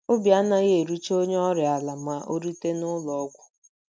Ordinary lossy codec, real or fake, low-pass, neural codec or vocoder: none; real; none; none